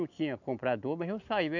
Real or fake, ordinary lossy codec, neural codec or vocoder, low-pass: real; none; none; 7.2 kHz